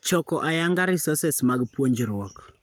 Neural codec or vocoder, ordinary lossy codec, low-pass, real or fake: codec, 44.1 kHz, 7.8 kbps, Pupu-Codec; none; none; fake